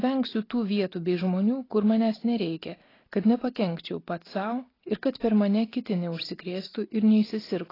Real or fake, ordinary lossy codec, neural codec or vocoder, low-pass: real; AAC, 24 kbps; none; 5.4 kHz